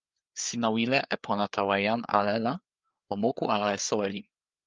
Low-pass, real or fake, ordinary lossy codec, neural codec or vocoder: 7.2 kHz; fake; Opus, 32 kbps; codec, 16 kHz, 4 kbps, FreqCodec, larger model